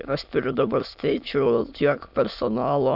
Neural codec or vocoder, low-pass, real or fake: autoencoder, 22.05 kHz, a latent of 192 numbers a frame, VITS, trained on many speakers; 5.4 kHz; fake